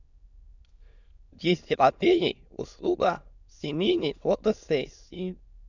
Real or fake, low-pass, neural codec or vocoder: fake; 7.2 kHz; autoencoder, 22.05 kHz, a latent of 192 numbers a frame, VITS, trained on many speakers